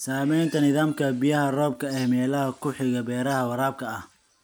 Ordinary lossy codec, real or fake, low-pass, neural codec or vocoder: none; real; none; none